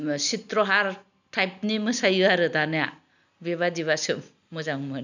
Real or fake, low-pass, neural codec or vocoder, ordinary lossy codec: real; 7.2 kHz; none; none